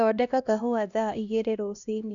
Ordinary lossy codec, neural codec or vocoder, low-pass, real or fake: none; codec, 16 kHz, 1 kbps, X-Codec, HuBERT features, trained on LibriSpeech; 7.2 kHz; fake